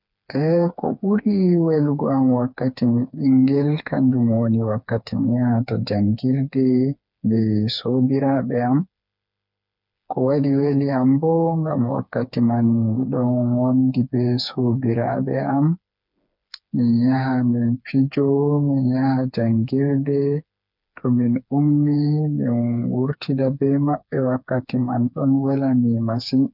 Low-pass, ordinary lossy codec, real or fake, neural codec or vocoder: 5.4 kHz; none; fake; codec, 16 kHz, 4 kbps, FreqCodec, smaller model